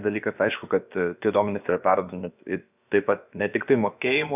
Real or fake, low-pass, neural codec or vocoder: fake; 3.6 kHz; codec, 16 kHz, about 1 kbps, DyCAST, with the encoder's durations